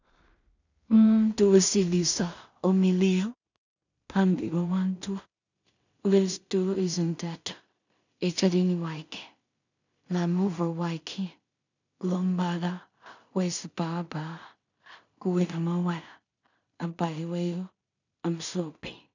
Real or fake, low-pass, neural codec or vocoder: fake; 7.2 kHz; codec, 16 kHz in and 24 kHz out, 0.4 kbps, LongCat-Audio-Codec, two codebook decoder